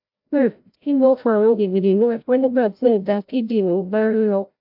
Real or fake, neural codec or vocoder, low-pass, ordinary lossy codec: fake; codec, 16 kHz, 0.5 kbps, FreqCodec, larger model; 5.4 kHz; AAC, 48 kbps